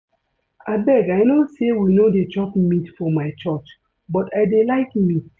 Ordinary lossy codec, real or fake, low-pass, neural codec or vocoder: none; real; none; none